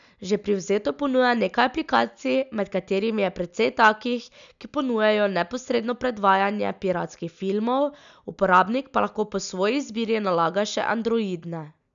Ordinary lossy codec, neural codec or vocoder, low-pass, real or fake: none; none; 7.2 kHz; real